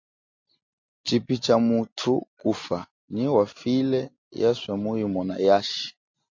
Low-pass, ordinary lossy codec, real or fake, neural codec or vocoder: 7.2 kHz; MP3, 48 kbps; real; none